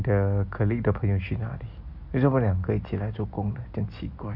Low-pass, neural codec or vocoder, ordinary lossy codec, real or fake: 5.4 kHz; none; Opus, 64 kbps; real